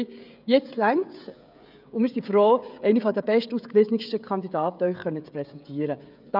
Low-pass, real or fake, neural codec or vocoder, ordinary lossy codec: 5.4 kHz; fake; codec, 16 kHz, 16 kbps, FreqCodec, smaller model; none